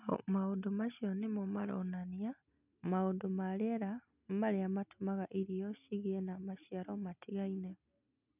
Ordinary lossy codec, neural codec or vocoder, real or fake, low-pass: none; none; real; 3.6 kHz